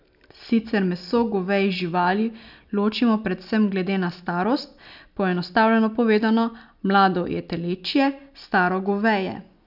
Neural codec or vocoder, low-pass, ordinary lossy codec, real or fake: none; 5.4 kHz; none; real